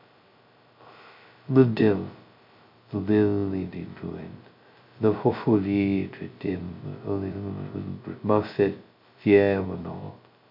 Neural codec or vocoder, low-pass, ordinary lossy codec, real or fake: codec, 16 kHz, 0.2 kbps, FocalCodec; 5.4 kHz; MP3, 48 kbps; fake